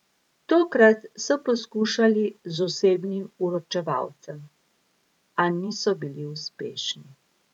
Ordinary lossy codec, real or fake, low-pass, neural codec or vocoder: none; fake; 19.8 kHz; vocoder, 44.1 kHz, 128 mel bands every 512 samples, BigVGAN v2